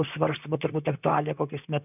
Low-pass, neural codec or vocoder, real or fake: 3.6 kHz; vocoder, 44.1 kHz, 128 mel bands, Pupu-Vocoder; fake